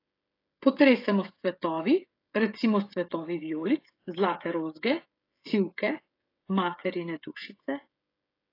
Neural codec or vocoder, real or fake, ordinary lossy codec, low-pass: codec, 16 kHz, 16 kbps, FreqCodec, smaller model; fake; AAC, 32 kbps; 5.4 kHz